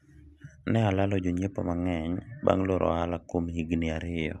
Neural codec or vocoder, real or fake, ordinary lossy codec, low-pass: none; real; none; none